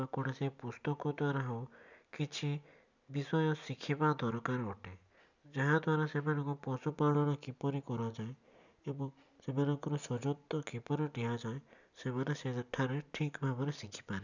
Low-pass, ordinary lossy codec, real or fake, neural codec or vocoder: 7.2 kHz; none; real; none